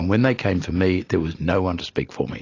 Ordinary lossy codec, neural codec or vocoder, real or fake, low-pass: AAC, 32 kbps; none; real; 7.2 kHz